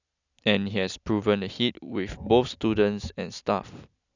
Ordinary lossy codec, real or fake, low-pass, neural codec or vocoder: none; real; 7.2 kHz; none